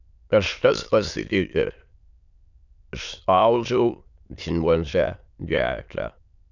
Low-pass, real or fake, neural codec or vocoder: 7.2 kHz; fake; autoencoder, 22.05 kHz, a latent of 192 numbers a frame, VITS, trained on many speakers